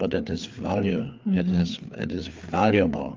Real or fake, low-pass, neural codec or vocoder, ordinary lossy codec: fake; 7.2 kHz; codec, 16 kHz, 4 kbps, FreqCodec, larger model; Opus, 32 kbps